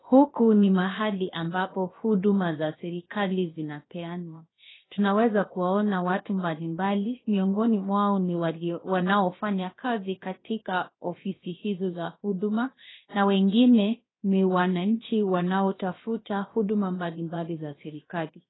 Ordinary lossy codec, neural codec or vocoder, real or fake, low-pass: AAC, 16 kbps; codec, 16 kHz, 0.7 kbps, FocalCodec; fake; 7.2 kHz